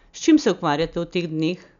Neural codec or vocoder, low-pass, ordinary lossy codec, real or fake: none; 7.2 kHz; none; real